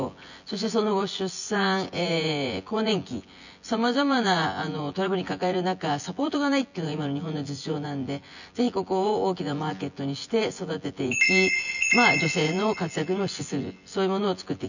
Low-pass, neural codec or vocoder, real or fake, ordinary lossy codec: 7.2 kHz; vocoder, 24 kHz, 100 mel bands, Vocos; fake; none